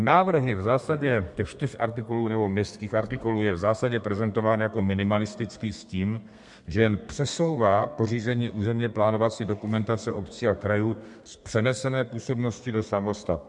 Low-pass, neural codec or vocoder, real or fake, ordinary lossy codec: 10.8 kHz; codec, 32 kHz, 1.9 kbps, SNAC; fake; MP3, 64 kbps